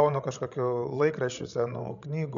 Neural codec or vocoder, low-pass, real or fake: codec, 16 kHz, 16 kbps, FreqCodec, larger model; 7.2 kHz; fake